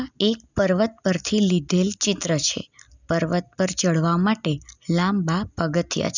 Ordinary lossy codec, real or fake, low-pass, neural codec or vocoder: none; real; 7.2 kHz; none